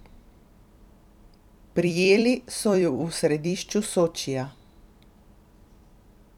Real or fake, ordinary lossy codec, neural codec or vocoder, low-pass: fake; none; vocoder, 44.1 kHz, 128 mel bands every 256 samples, BigVGAN v2; 19.8 kHz